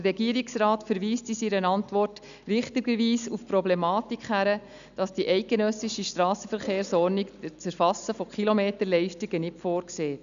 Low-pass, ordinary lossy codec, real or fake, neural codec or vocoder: 7.2 kHz; none; real; none